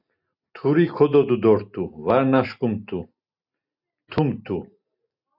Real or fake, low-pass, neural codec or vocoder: real; 5.4 kHz; none